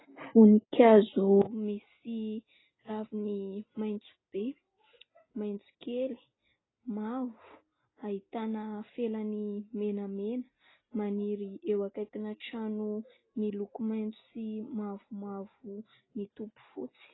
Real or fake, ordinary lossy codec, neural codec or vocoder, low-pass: real; AAC, 16 kbps; none; 7.2 kHz